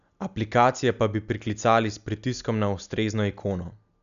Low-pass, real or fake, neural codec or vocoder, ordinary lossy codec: 7.2 kHz; real; none; none